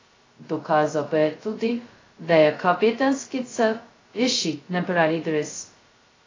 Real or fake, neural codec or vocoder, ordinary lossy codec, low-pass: fake; codec, 16 kHz, 0.2 kbps, FocalCodec; AAC, 32 kbps; 7.2 kHz